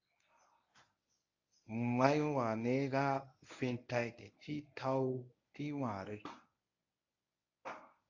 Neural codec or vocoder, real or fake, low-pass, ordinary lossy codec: codec, 24 kHz, 0.9 kbps, WavTokenizer, medium speech release version 1; fake; 7.2 kHz; AAC, 48 kbps